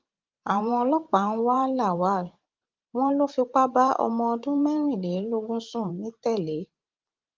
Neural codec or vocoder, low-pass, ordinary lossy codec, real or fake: vocoder, 44.1 kHz, 128 mel bands every 512 samples, BigVGAN v2; 7.2 kHz; Opus, 32 kbps; fake